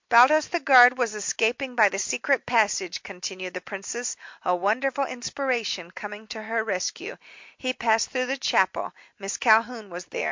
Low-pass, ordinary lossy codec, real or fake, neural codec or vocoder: 7.2 kHz; MP3, 48 kbps; real; none